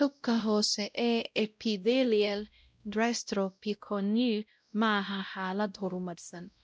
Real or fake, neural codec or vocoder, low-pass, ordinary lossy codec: fake; codec, 16 kHz, 0.5 kbps, X-Codec, WavLM features, trained on Multilingual LibriSpeech; none; none